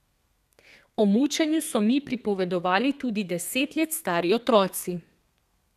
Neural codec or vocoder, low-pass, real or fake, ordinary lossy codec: codec, 32 kHz, 1.9 kbps, SNAC; 14.4 kHz; fake; none